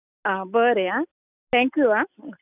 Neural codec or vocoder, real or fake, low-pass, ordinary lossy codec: none; real; 3.6 kHz; none